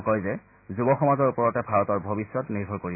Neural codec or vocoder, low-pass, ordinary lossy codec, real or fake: none; 3.6 kHz; AAC, 24 kbps; real